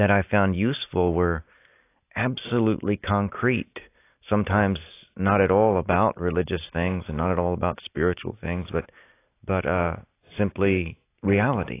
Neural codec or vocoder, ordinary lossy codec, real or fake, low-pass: none; AAC, 24 kbps; real; 3.6 kHz